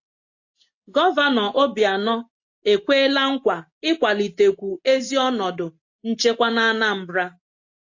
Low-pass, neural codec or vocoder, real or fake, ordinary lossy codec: 7.2 kHz; none; real; AAC, 48 kbps